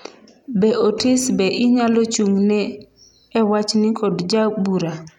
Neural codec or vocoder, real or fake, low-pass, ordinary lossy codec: none; real; 19.8 kHz; none